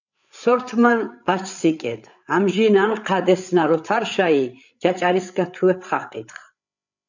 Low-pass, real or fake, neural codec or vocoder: 7.2 kHz; fake; codec, 16 kHz, 8 kbps, FreqCodec, larger model